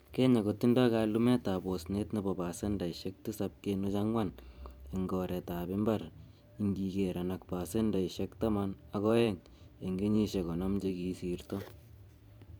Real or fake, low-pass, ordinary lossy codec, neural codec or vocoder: fake; none; none; vocoder, 44.1 kHz, 128 mel bands every 512 samples, BigVGAN v2